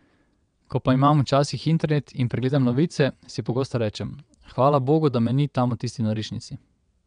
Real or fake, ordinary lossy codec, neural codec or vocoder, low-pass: fake; none; vocoder, 22.05 kHz, 80 mel bands, WaveNeXt; 9.9 kHz